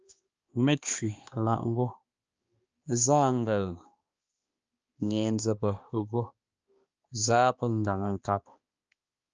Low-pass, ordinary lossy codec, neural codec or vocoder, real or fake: 7.2 kHz; Opus, 24 kbps; codec, 16 kHz, 2 kbps, X-Codec, HuBERT features, trained on balanced general audio; fake